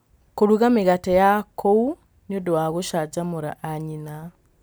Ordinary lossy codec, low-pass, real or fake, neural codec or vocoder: none; none; real; none